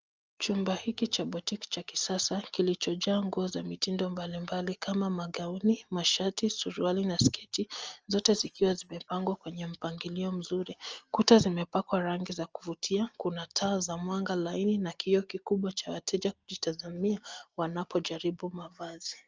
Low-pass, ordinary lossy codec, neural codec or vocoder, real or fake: 7.2 kHz; Opus, 24 kbps; none; real